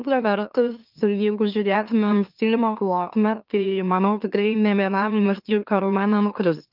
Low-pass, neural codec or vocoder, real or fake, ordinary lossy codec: 5.4 kHz; autoencoder, 44.1 kHz, a latent of 192 numbers a frame, MeloTTS; fake; Opus, 24 kbps